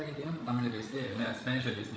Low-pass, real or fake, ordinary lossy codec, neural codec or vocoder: none; fake; none; codec, 16 kHz, 16 kbps, FreqCodec, larger model